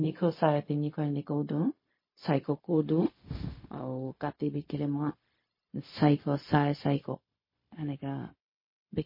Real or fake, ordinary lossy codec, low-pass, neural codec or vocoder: fake; MP3, 24 kbps; 5.4 kHz; codec, 16 kHz, 0.4 kbps, LongCat-Audio-Codec